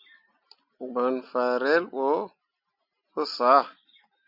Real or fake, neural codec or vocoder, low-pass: real; none; 5.4 kHz